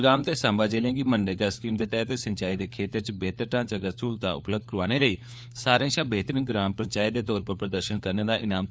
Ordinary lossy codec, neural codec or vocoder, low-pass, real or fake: none; codec, 16 kHz, 4 kbps, FunCodec, trained on LibriTTS, 50 frames a second; none; fake